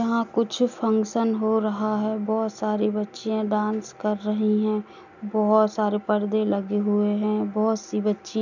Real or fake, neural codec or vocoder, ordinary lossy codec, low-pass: real; none; none; 7.2 kHz